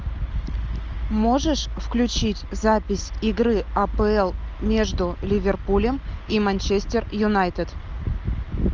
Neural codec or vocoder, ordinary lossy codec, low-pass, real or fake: none; Opus, 24 kbps; 7.2 kHz; real